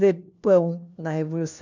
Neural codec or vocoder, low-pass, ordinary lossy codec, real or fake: codec, 16 kHz, 1 kbps, FunCodec, trained on LibriTTS, 50 frames a second; 7.2 kHz; none; fake